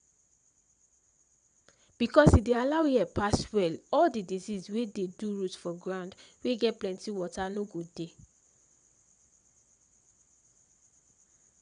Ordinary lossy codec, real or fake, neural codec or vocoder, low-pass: none; fake; vocoder, 22.05 kHz, 80 mel bands, WaveNeXt; 9.9 kHz